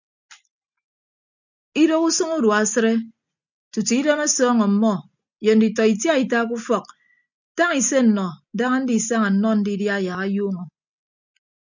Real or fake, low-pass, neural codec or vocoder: real; 7.2 kHz; none